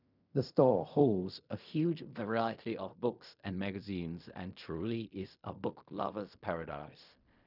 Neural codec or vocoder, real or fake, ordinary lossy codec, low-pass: codec, 16 kHz in and 24 kHz out, 0.4 kbps, LongCat-Audio-Codec, fine tuned four codebook decoder; fake; none; 5.4 kHz